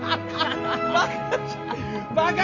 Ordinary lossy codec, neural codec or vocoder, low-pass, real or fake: none; none; 7.2 kHz; real